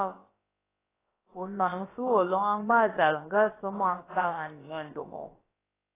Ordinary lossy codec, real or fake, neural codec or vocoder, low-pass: AAC, 16 kbps; fake; codec, 16 kHz, about 1 kbps, DyCAST, with the encoder's durations; 3.6 kHz